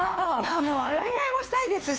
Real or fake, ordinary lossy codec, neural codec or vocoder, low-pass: fake; none; codec, 16 kHz, 2 kbps, X-Codec, WavLM features, trained on Multilingual LibriSpeech; none